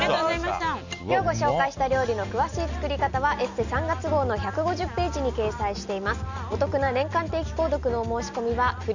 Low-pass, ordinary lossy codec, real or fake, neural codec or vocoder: 7.2 kHz; none; real; none